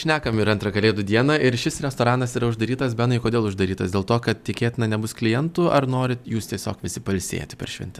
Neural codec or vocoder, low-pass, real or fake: none; 14.4 kHz; real